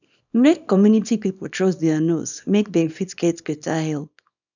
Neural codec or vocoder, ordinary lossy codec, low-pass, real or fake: codec, 24 kHz, 0.9 kbps, WavTokenizer, small release; none; 7.2 kHz; fake